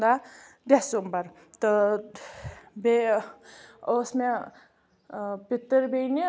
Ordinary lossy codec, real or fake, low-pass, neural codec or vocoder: none; real; none; none